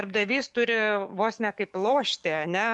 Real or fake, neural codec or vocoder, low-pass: fake; codec, 44.1 kHz, 7.8 kbps, DAC; 10.8 kHz